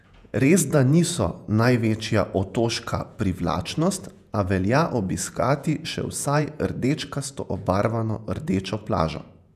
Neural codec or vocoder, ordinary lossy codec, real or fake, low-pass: vocoder, 48 kHz, 128 mel bands, Vocos; none; fake; 14.4 kHz